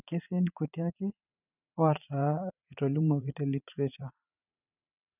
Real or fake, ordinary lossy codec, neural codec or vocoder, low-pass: fake; none; vocoder, 44.1 kHz, 80 mel bands, Vocos; 3.6 kHz